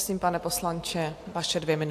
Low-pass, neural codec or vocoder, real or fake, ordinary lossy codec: 14.4 kHz; none; real; AAC, 64 kbps